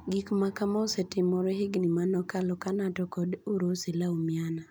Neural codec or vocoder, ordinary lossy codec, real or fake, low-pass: none; none; real; none